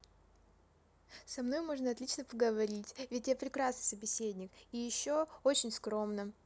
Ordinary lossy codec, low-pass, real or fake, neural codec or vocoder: none; none; real; none